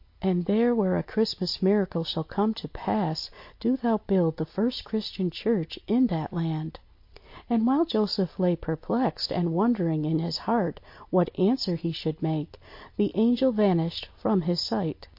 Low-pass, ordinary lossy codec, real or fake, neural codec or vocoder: 5.4 kHz; MP3, 32 kbps; real; none